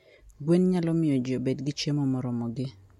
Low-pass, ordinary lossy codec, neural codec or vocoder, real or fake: 19.8 kHz; MP3, 64 kbps; none; real